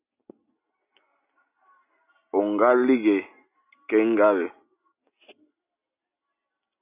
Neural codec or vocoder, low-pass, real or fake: none; 3.6 kHz; real